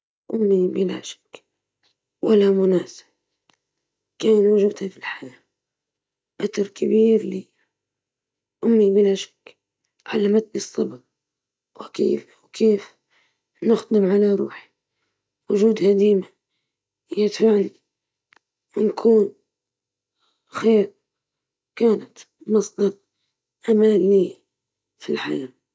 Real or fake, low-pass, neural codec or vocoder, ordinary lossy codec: real; none; none; none